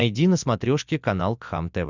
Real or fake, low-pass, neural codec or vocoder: real; 7.2 kHz; none